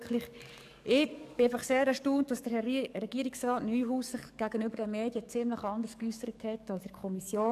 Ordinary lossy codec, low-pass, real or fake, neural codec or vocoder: none; 14.4 kHz; fake; codec, 44.1 kHz, 7.8 kbps, DAC